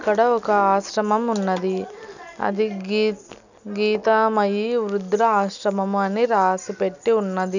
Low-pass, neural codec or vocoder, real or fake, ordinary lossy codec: 7.2 kHz; none; real; none